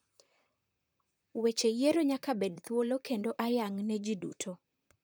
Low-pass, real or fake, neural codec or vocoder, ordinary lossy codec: none; fake; vocoder, 44.1 kHz, 128 mel bands, Pupu-Vocoder; none